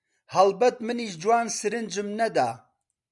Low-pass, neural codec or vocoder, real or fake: 10.8 kHz; none; real